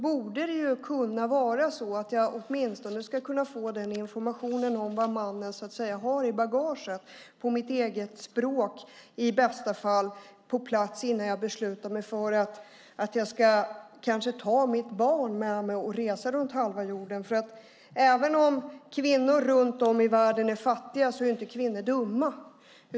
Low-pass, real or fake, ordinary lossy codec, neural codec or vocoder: none; real; none; none